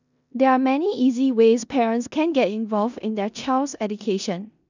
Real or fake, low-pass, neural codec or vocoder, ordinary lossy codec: fake; 7.2 kHz; codec, 16 kHz in and 24 kHz out, 0.9 kbps, LongCat-Audio-Codec, four codebook decoder; none